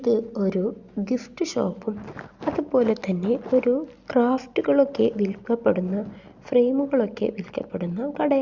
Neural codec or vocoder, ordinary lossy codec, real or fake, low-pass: none; Opus, 64 kbps; real; 7.2 kHz